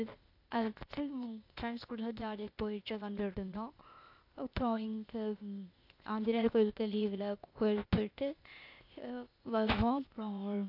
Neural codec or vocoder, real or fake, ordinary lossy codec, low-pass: codec, 16 kHz, 0.8 kbps, ZipCodec; fake; AAC, 48 kbps; 5.4 kHz